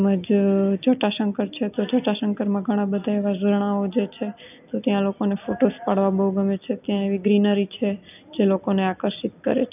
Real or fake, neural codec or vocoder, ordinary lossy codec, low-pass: real; none; none; 3.6 kHz